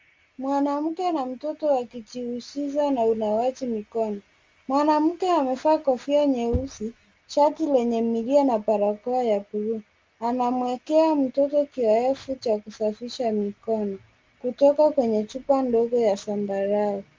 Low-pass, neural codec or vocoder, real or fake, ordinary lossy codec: 7.2 kHz; none; real; Opus, 32 kbps